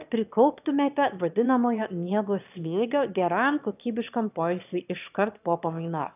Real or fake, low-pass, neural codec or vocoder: fake; 3.6 kHz; autoencoder, 22.05 kHz, a latent of 192 numbers a frame, VITS, trained on one speaker